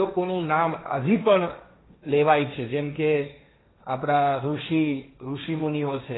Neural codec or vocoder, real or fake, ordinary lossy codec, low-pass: codec, 16 kHz, 1.1 kbps, Voila-Tokenizer; fake; AAC, 16 kbps; 7.2 kHz